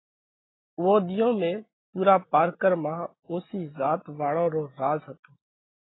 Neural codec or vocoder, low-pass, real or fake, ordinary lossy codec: none; 7.2 kHz; real; AAC, 16 kbps